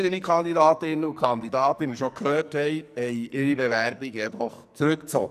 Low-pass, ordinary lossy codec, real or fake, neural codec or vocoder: 14.4 kHz; none; fake; codec, 44.1 kHz, 2.6 kbps, SNAC